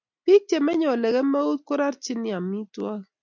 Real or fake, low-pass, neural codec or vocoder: real; 7.2 kHz; none